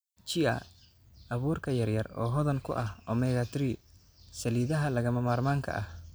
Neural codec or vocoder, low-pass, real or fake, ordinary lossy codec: none; none; real; none